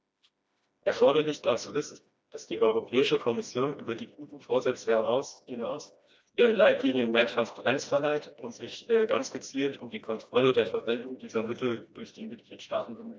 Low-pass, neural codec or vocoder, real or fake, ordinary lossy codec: none; codec, 16 kHz, 1 kbps, FreqCodec, smaller model; fake; none